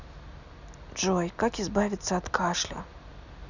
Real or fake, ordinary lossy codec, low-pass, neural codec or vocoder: real; none; 7.2 kHz; none